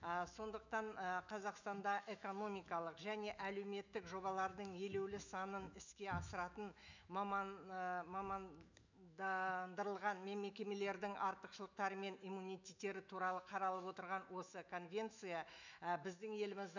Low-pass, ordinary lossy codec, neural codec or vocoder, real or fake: 7.2 kHz; none; autoencoder, 48 kHz, 128 numbers a frame, DAC-VAE, trained on Japanese speech; fake